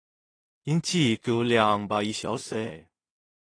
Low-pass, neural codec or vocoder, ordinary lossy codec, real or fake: 9.9 kHz; codec, 16 kHz in and 24 kHz out, 0.4 kbps, LongCat-Audio-Codec, two codebook decoder; AAC, 32 kbps; fake